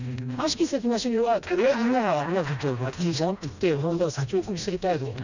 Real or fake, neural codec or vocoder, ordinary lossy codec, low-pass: fake; codec, 16 kHz, 1 kbps, FreqCodec, smaller model; none; 7.2 kHz